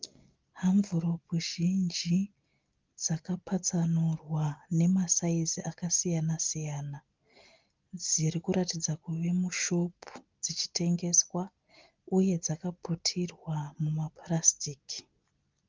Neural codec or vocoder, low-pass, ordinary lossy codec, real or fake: none; 7.2 kHz; Opus, 32 kbps; real